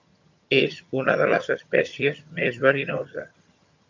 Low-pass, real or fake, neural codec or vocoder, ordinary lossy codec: 7.2 kHz; fake; vocoder, 22.05 kHz, 80 mel bands, HiFi-GAN; MP3, 64 kbps